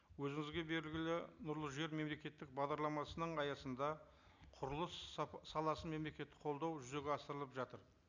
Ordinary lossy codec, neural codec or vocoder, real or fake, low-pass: none; none; real; 7.2 kHz